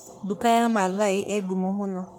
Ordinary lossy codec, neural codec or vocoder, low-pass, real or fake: none; codec, 44.1 kHz, 1.7 kbps, Pupu-Codec; none; fake